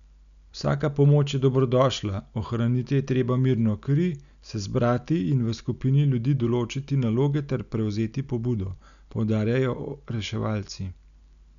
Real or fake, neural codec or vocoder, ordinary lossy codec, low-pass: real; none; none; 7.2 kHz